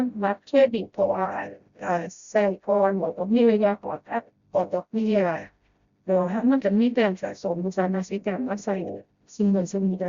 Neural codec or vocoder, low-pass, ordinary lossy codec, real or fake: codec, 16 kHz, 0.5 kbps, FreqCodec, smaller model; 7.2 kHz; Opus, 64 kbps; fake